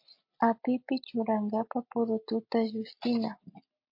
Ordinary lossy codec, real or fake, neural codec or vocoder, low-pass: MP3, 32 kbps; real; none; 5.4 kHz